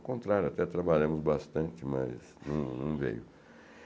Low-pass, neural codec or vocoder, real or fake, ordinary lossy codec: none; none; real; none